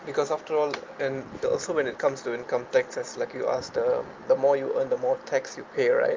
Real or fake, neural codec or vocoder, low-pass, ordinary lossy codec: real; none; 7.2 kHz; Opus, 24 kbps